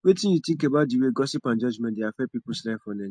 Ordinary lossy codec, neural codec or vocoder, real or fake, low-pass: MP3, 48 kbps; none; real; 9.9 kHz